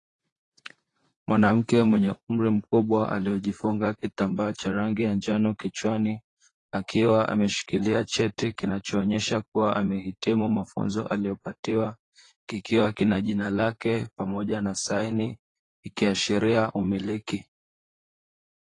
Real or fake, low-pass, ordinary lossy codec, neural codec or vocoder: fake; 10.8 kHz; AAC, 32 kbps; vocoder, 44.1 kHz, 128 mel bands, Pupu-Vocoder